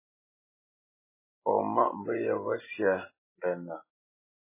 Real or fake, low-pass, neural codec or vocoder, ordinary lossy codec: real; 3.6 kHz; none; MP3, 16 kbps